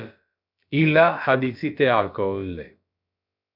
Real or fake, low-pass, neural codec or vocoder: fake; 5.4 kHz; codec, 16 kHz, about 1 kbps, DyCAST, with the encoder's durations